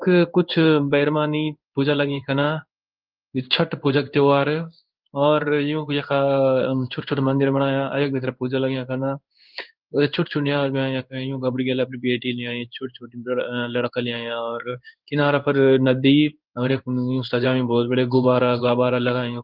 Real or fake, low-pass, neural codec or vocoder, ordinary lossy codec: fake; 5.4 kHz; codec, 16 kHz in and 24 kHz out, 1 kbps, XY-Tokenizer; Opus, 24 kbps